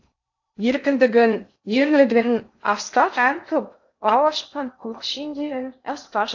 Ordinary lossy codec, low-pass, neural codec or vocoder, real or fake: AAC, 48 kbps; 7.2 kHz; codec, 16 kHz in and 24 kHz out, 0.6 kbps, FocalCodec, streaming, 2048 codes; fake